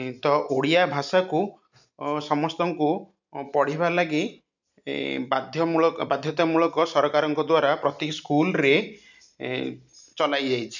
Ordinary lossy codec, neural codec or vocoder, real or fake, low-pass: none; none; real; 7.2 kHz